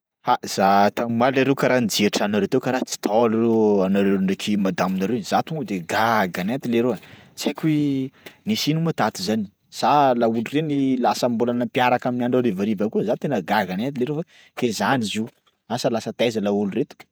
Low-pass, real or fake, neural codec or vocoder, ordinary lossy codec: none; fake; vocoder, 48 kHz, 128 mel bands, Vocos; none